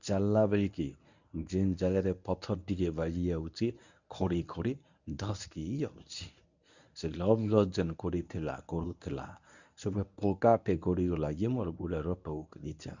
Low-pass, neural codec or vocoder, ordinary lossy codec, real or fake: 7.2 kHz; codec, 24 kHz, 0.9 kbps, WavTokenizer, medium speech release version 1; none; fake